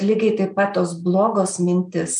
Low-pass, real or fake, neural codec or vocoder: 9.9 kHz; real; none